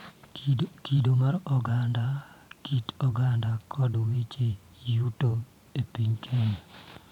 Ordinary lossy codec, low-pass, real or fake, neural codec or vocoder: none; 19.8 kHz; fake; vocoder, 44.1 kHz, 128 mel bands every 512 samples, BigVGAN v2